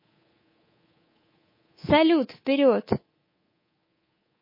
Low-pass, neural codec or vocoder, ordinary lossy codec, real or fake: 5.4 kHz; codec, 24 kHz, 3.1 kbps, DualCodec; MP3, 24 kbps; fake